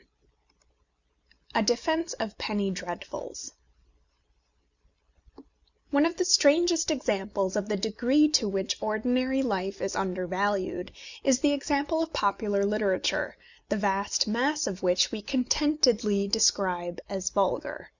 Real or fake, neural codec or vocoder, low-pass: real; none; 7.2 kHz